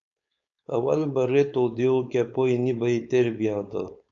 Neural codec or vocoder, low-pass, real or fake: codec, 16 kHz, 4.8 kbps, FACodec; 7.2 kHz; fake